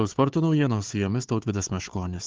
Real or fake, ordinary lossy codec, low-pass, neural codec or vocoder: fake; Opus, 16 kbps; 7.2 kHz; codec, 16 kHz, 4 kbps, FunCodec, trained on Chinese and English, 50 frames a second